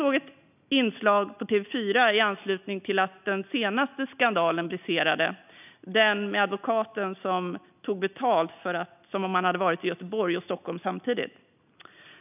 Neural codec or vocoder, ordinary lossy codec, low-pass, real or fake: none; none; 3.6 kHz; real